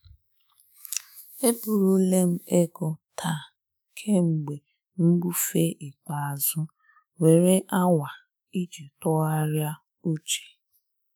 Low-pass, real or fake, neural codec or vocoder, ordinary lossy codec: none; fake; autoencoder, 48 kHz, 128 numbers a frame, DAC-VAE, trained on Japanese speech; none